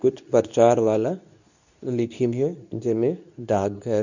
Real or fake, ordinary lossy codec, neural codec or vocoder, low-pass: fake; none; codec, 24 kHz, 0.9 kbps, WavTokenizer, medium speech release version 2; 7.2 kHz